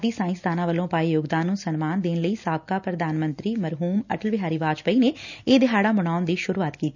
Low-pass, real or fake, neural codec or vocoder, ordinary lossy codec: 7.2 kHz; real; none; none